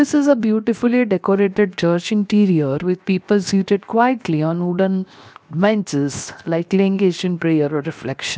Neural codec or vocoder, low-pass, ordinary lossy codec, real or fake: codec, 16 kHz, 0.7 kbps, FocalCodec; none; none; fake